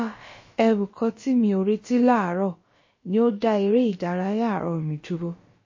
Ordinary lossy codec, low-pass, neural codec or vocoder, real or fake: MP3, 32 kbps; 7.2 kHz; codec, 16 kHz, about 1 kbps, DyCAST, with the encoder's durations; fake